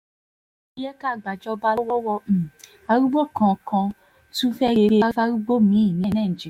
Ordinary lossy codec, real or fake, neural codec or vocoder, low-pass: MP3, 64 kbps; fake; autoencoder, 48 kHz, 128 numbers a frame, DAC-VAE, trained on Japanese speech; 19.8 kHz